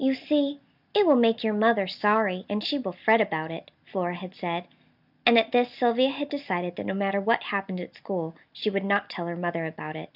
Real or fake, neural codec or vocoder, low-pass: real; none; 5.4 kHz